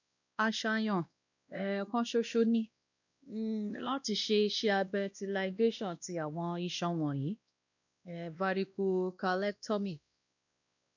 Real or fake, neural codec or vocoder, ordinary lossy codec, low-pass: fake; codec, 16 kHz, 1 kbps, X-Codec, WavLM features, trained on Multilingual LibriSpeech; none; 7.2 kHz